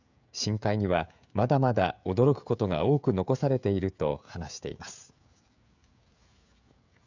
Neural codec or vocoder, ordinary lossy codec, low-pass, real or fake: codec, 16 kHz, 16 kbps, FreqCodec, smaller model; none; 7.2 kHz; fake